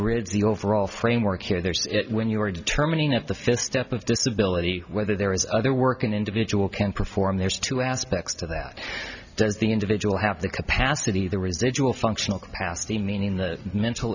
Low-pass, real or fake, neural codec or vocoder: 7.2 kHz; real; none